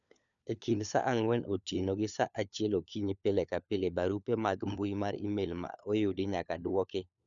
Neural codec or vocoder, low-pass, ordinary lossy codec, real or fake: codec, 16 kHz, 4 kbps, FunCodec, trained on LibriTTS, 50 frames a second; 7.2 kHz; none; fake